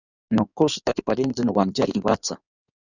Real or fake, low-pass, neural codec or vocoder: fake; 7.2 kHz; codec, 16 kHz in and 24 kHz out, 2.2 kbps, FireRedTTS-2 codec